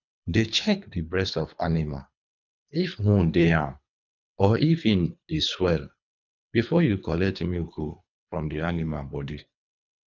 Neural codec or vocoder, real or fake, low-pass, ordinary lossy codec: codec, 24 kHz, 3 kbps, HILCodec; fake; 7.2 kHz; none